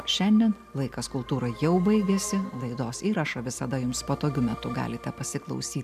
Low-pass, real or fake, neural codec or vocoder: 14.4 kHz; real; none